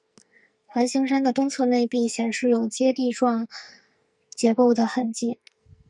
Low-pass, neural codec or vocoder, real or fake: 10.8 kHz; codec, 44.1 kHz, 2.6 kbps, SNAC; fake